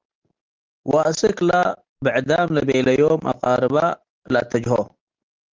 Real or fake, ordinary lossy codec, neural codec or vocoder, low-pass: real; Opus, 16 kbps; none; 7.2 kHz